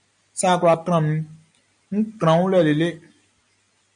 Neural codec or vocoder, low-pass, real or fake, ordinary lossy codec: none; 9.9 kHz; real; MP3, 96 kbps